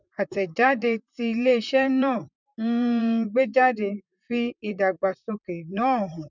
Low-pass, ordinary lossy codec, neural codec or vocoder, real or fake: 7.2 kHz; none; vocoder, 44.1 kHz, 128 mel bands, Pupu-Vocoder; fake